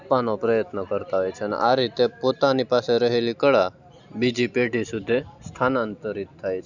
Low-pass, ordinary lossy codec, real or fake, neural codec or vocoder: 7.2 kHz; none; real; none